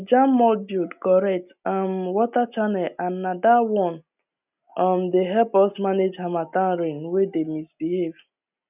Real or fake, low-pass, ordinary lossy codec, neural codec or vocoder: real; 3.6 kHz; none; none